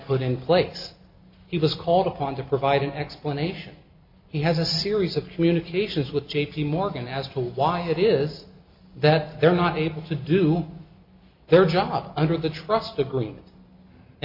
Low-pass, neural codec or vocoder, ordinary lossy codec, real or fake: 5.4 kHz; none; MP3, 48 kbps; real